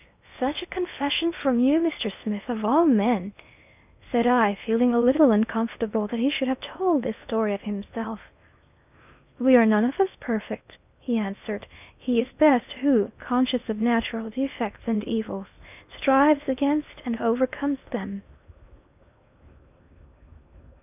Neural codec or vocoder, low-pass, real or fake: codec, 16 kHz in and 24 kHz out, 0.8 kbps, FocalCodec, streaming, 65536 codes; 3.6 kHz; fake